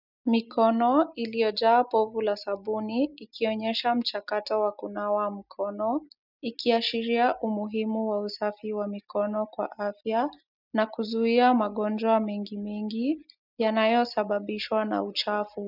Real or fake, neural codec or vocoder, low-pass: real; none; 5.4 kHz